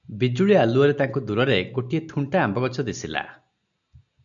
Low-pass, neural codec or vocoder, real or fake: 7.2 kHz; none; real